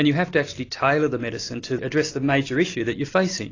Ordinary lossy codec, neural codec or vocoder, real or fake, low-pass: AAC, 32 kbps; none; real; 7.2 kHz